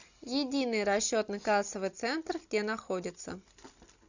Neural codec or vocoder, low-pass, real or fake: none; 7.2 kHz; real